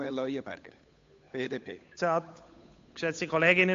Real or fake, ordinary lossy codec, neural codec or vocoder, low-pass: fake; AAC, 48 kbps; codec, 16 kHz, 8 kbps, FunCodec, trained on Chinese and English, 25 frames a second; 7.2 kHz